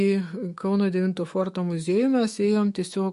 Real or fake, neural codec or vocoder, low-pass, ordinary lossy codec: fake; autoencoder, 48 kHz, 128 numbers a frame, DAC-VAE, trained on Japanese speech; 14.4 kHz; MP3, 48 kbps